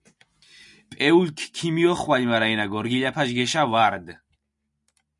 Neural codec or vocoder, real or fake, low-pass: none; real; 10.8 kHz